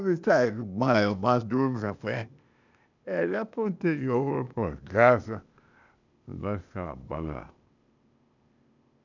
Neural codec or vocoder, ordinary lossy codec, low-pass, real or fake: codec, 16 kHz, 0.8 kbps, ZipCodec; none; 7.2 kHz; fake